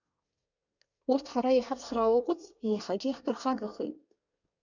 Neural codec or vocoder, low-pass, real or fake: codec, 24 kHz, 1 kbps, SNAC; 7.2 kHz; fake